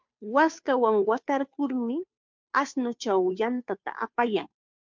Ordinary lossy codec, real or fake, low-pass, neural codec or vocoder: MP3, 64 kbps; fake; 7.2 kHz; codec, 16 kHz, 2 kbps, FunCodec, trained on Chinese and English, 25 frames a second